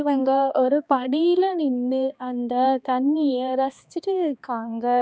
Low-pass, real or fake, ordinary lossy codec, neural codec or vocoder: none; fake; none; codec, 16 kHz, 2 kbps, X-Codec, HuBERT features, trained on balanced general audio